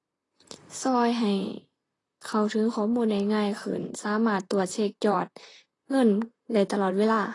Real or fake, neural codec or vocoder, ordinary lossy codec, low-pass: fake; vocoder, 44.1 kHz, 128 mel bands, Pupu-Vocoder; AAC, 32 kbps; 10.8 kHz